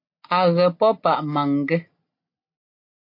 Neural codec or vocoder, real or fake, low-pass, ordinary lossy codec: none; real; 5.4 kHz; MP3, 32 kbps